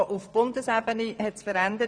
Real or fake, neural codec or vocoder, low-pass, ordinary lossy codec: fake; vocoder, 24 kHz, 100 mel bands, Vocos; 9.9 kHz; none